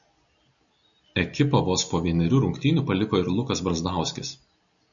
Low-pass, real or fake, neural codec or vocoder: 7.2 kHz; real; none